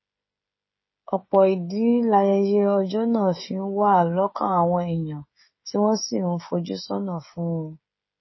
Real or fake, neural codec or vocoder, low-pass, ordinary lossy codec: fake; codec, 16 kHz, 16 kbps, FreqCodec, smaller model; 7.2 kHz; MP3, 24 kbps